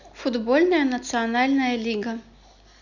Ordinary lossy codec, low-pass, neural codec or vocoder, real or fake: none; 7.2 kHz; none; real